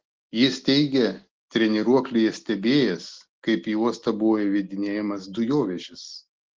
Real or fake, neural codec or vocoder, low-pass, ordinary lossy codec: real; none; 7.2 kHz; Opus, 16 kbps